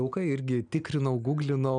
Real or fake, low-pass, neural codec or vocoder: real; 9.9 kHz; none